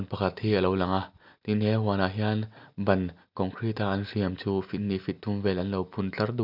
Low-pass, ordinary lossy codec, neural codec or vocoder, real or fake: 5.4 kHz; none; none; real